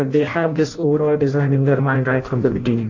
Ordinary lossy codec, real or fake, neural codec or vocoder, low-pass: AAC, 48 kbps; fake; codec, 16 kHz in and 24 kHz out, 0.6 kbps, FireRedTTS-2 codec; 7.2 kHz